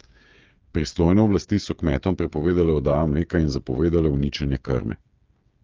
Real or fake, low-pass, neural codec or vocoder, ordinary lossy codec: fake; 7.2 kHz; codec, 16 kHz, 8 kbps, FreqCodec, smaller model; Opus, 24 kbps